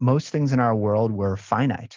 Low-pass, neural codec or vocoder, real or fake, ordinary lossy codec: 7.2 kHz; none; real; Opus, 32 kbps